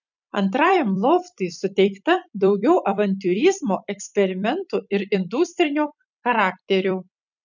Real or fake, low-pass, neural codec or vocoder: fake; 7.2 kHz; vocoder, 44.1 kHz, 128 mel bands every 256 samples, BigVGAN v2